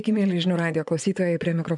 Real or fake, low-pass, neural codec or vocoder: fake; 10.8 kHz; vocoder, 24 kHz, 100 mel bands, Vocos